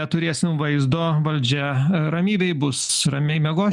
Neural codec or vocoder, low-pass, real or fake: none; 10.8 kHz; real